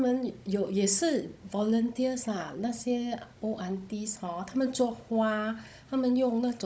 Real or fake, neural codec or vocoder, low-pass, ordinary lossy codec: fake; codec, 16 kHz, 16 kbps, FunCodec, trained on Chinese and English, 50 frames a second; none; none